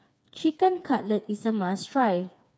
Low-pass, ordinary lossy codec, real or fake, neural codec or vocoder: none; none; fake; codec, 16 kHz, 4 kbps, FreqCodec, smaller model